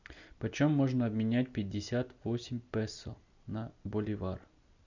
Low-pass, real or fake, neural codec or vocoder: 7.2 kHz; real; none